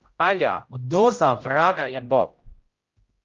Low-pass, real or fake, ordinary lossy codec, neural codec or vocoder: 7.2 kHz; fake; Opus, 32 kbps; codec, 16 kHz, 0.5 kbps, X-Codec, HuBERT features, trained on general audio